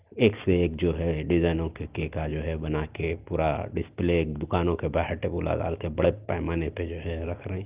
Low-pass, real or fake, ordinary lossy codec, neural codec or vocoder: 3.6 kHz; real; Opus, 32 kbps; none